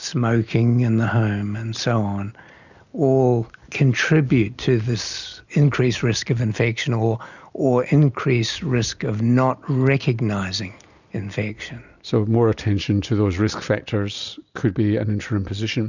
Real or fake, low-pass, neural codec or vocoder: real; 7.2 kHz; none